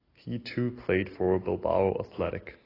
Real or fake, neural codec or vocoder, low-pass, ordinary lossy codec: real; none; 5.4 kHz; AAC, 24 kbps